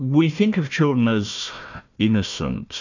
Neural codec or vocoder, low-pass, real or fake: codec, 16 kHz, 1 kbps, FunCodec, trained on Chinese and English, 50 frames a second; 7.2 kHz; fake